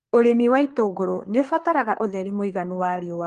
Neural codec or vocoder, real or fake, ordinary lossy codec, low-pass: codec, 24 kHz, 1 kbps, SNAC; fake; Opus, 24 kbps; 10.8 kHz